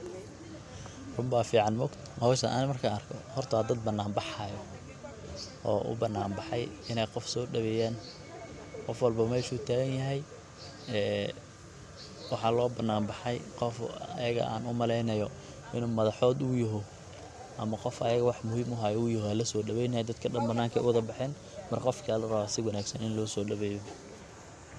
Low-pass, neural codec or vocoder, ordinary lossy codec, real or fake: none; none; none; real